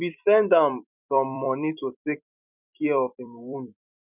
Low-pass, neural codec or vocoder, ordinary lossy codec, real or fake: 3.6 kHz; vocoder, 44.1 kHz, 128 mel bands every 256 samples, BigVGAN v2; none; fake